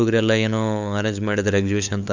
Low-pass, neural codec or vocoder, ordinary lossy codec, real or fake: 7.2 kHz; codec, 16 kHz, 8 kbps, FunCodec, trained on LibriTTS, 25 frames a second; none; fake